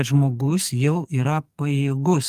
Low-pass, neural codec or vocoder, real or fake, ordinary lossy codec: 14.4 kHz; codec, 44.1 kHz, 2.6 kbps, SNAC; fake; Opus, 32 kbps